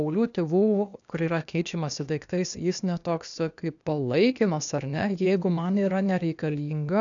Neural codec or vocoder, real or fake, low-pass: codec, 16 kHz, 0.8 kbps, ZipCodec; fake; 7.2 kHz